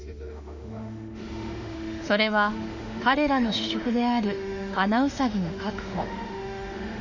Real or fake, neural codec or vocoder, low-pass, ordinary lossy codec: fake; autoencoder, 48 kHz, 32 numbers a frame, DAC-VAE, trained on Japanese speech; 7.2 kHz; none